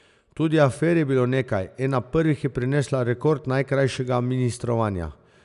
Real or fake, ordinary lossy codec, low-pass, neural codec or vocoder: real; none; 10.8 kHz; none